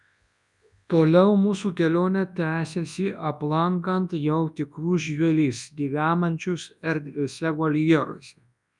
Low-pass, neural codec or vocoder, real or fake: 10.8 kHz; codec, 24 kHz, 0.9 kbps, WavTokenizer, large speech release; fake